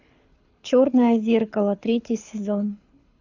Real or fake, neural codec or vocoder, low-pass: fake; codec, 24 kHz, 6 kbps, HILCodec; 7.2 kHz